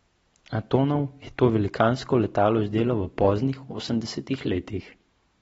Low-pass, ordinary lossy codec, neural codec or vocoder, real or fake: 19.8 kHz; AAC, 24 kbps; none; real